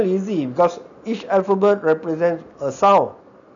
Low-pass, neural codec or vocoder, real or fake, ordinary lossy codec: 7.2 kHz; none; real; none